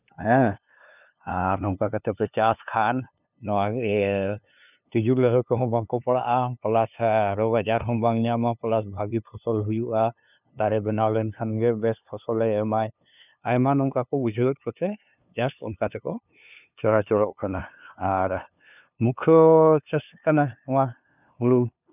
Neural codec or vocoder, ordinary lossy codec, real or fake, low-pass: codec, 16 kHz, 2 kbps, FunCodec, trained on LibriTTS, 25 frames a second; none; fake; 3.6 kHz